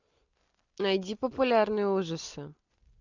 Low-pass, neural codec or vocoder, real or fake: 7.2 kHz; none; real